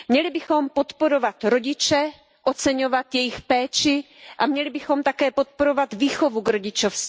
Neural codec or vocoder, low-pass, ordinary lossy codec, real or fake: none; none; none; real